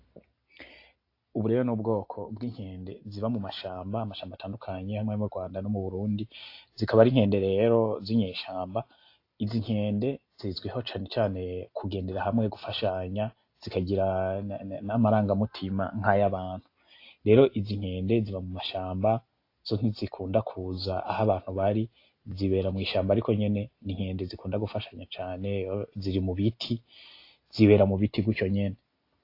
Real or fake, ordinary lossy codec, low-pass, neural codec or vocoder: real; AAC, 32 kbps; 5.4 kHz; none